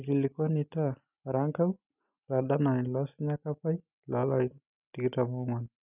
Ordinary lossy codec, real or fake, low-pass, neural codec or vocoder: none; real; 3.6 kHz; none